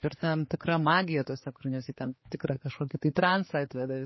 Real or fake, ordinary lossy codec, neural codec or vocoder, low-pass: fake; MP3, 24 kbps; codec, 16 kHz, 4 kbps, X-Codec, HuBERT features, trained on general audio; 7.2 kHz